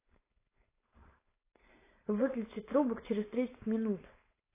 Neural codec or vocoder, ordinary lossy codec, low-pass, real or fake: codec, 16 kHz, 4.8 kbps, FACodec; AAC, 16 kbps; 3.6 kHz; fake